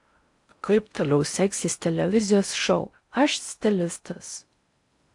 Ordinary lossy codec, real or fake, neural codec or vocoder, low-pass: AAC, 64 kbps; fake; codec, 16 kHz in and 24 kHz out, 0.6 kbps, FocalCodec, streaming, 4096 codes; 10.8 kHz